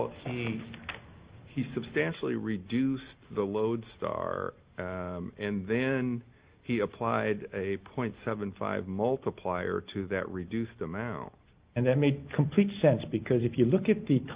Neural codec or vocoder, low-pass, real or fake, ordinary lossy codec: none; 3.6 kHz; real; Opus, 32 kbps